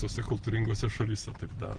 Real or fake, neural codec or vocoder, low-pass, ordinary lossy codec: real; none; 9.9 kHz; Opus, 16 kbps